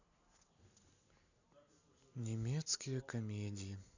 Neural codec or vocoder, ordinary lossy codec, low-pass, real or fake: none; none; 7.2 kHz; real